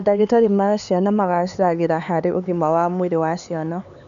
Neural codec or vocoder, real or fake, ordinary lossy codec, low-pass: codec, 16 kHz, 4 kbps, X-Codec, HuBERT features, trained on LibriSpeech; fake; none; 7.2 kHz